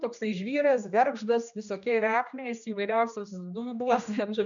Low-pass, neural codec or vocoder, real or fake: 7.2 kHz; codec, 16 kHz, 1 kbps, X-Codec, HuBERT features, trained on general audio; fake